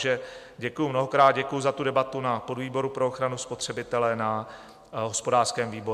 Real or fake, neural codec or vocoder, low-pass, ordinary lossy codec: real; none; 14.4 kHz; MP3, 96 kbps